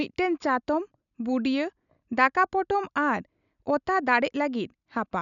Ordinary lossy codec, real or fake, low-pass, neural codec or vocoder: none; real; 7.2 kHz; none